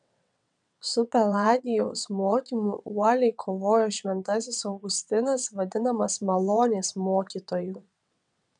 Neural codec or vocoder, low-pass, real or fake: vocoder, 22.05 kHz, 80 mel bands, WaveNeXt; 9.9 kHz; fake